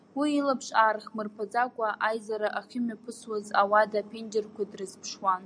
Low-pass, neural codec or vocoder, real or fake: 9.9 kHz; none; real